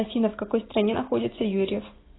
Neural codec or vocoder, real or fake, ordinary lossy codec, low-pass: none; real; AAC, 16 kbps; 7.2 kHz